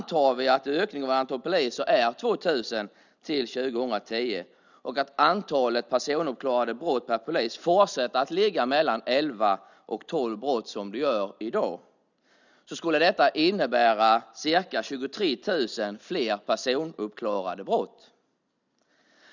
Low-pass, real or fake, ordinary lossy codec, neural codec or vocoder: 7.2 kHz; real; none; none